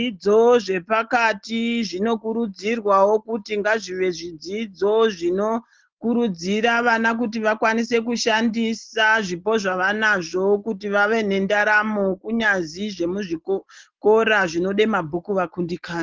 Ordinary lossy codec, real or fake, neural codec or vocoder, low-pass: Opus, 16 kbps; real; none; 7.2 kHz